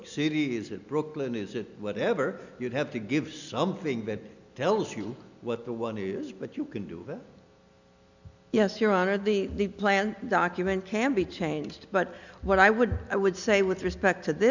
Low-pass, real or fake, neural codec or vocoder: 7.2 kHz; real; none